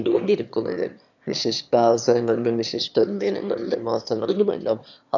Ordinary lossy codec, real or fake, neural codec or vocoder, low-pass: none; fake; autoencoder, 22.05 kHz, a latent of 192 numbers a frame, VITS, trained on one speaker; 7.2 kHz